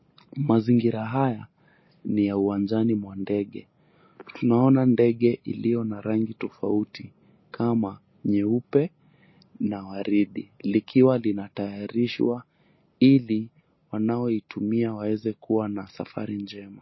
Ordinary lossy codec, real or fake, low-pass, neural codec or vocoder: MP3, 24 kbps; real; 7.2 kHz; none